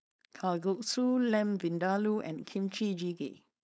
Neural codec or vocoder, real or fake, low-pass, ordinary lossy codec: codec, 16 kHz, 4.8 kbps, FACodec; fake; none; none